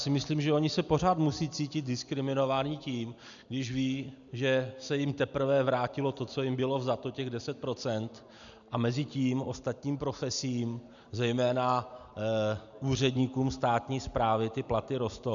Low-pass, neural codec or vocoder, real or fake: 7.2 kHz; none; real